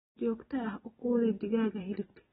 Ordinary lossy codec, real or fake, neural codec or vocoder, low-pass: AAC, 16 kbps; fake; vocoder, 44.1 kHz, 128 mel bands every 256 samples, BigVGAN v2; 19.8 kHz